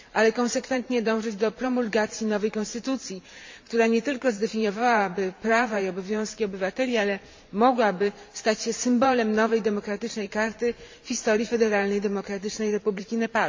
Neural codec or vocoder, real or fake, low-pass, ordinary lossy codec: vocoder, 44.1 kHz, 128 mel bands every 512 samples, BigVGAN v2; fake; 7.2 kHz; MP3, 48 kbps